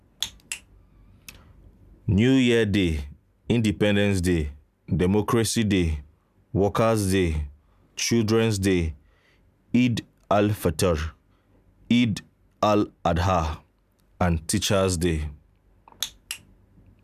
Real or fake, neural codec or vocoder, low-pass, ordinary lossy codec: real; none; 14.4 kHz; none